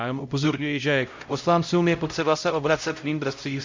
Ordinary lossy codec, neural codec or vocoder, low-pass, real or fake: MP3, 48 kbps; codec, 16 kHz, 0.5 kbps, X-Codec, HuBERT features, trained on LibriSpeech; 7.2 kHz; fake